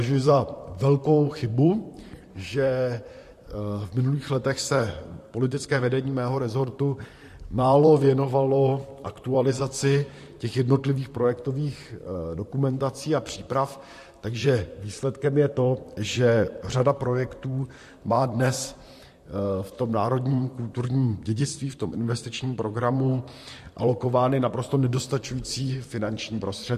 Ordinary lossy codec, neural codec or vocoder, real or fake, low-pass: MP3, 64 kbps; vocoder, 44.1 kHz, 128 mel bands, Pupu-Vocoder; fake; 14.4 kHz